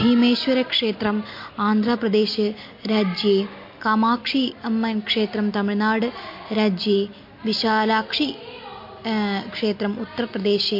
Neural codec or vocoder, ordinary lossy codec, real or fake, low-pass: none; MP3, 32 kbps; real; 5.4 kHz